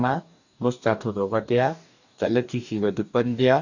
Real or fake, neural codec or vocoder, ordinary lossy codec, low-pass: fake; codec, 44.1 kHz, 2.6 kbps, DAC; none; 7.2 kHz